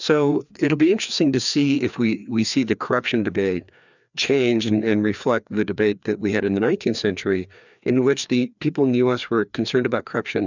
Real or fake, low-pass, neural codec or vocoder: fake; 7.2 kHz; codec, 16 kHz, 2 kbps, FreqCodec, larger model